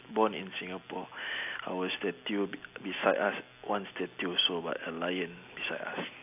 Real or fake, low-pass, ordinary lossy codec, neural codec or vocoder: real; 3.6 kHz; AAC, 32 kbps; none